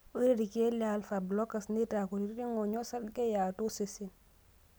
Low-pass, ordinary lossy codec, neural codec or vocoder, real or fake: none; none; none; real